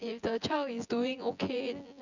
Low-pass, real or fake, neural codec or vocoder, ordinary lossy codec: 7.2 kHz; fake; vocoder, 24 kHz, 100 mel bands, Vocos; none